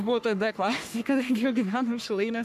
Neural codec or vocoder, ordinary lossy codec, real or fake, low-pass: codec, 44.1 kHz, 3.4 kbps, Pupu-Codec; AAC, 96 kbps; fake; 14.4 kHz